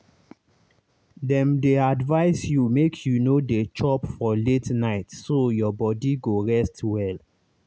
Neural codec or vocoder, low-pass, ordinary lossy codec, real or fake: none; none; none; real